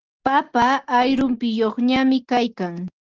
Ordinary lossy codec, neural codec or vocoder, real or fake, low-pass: Opus, 16 kbps; none; real; 7.2 kHz